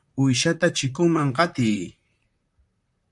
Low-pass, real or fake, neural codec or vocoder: 10.8 kHz; fake; vocoder, 44.1 kHz, 128 mel bands, Pupu-Vocoder